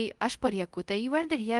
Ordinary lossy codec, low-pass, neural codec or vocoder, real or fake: Opus, 24 kbps; 10.8 kHz; codec, 24 kHz, 0.5 kbps, DualCodec; fake